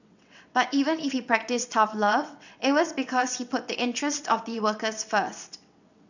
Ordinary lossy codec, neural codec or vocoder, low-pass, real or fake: none; vocoder, 22.05 kHz, 80 mel bands, WaveNeXt; 7.2 kHz; fake